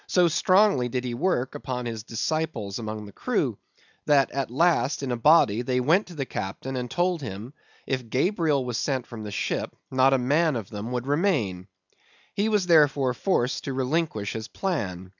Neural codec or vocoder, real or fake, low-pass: none; real; 7.2 kHz